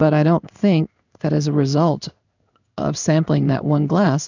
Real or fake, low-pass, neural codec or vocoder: fake; 7.2 kHz; codec, 16 kHz in and 24 kHz out, 1 kbps, XY-Tokenizer